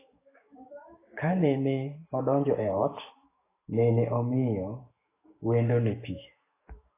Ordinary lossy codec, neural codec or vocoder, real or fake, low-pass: AAC, 16 kbps; autoencoder, 48 kHz, 128 numbers a frame, DAC-VAE, trained on Japanese speech; fake; 3.6 kHz